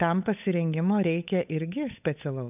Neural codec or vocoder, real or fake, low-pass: codec, 16 kHz, 8 kbps, FunCodec, trained on Chinese and English, 25 frames a second; fake; 3.6 kHz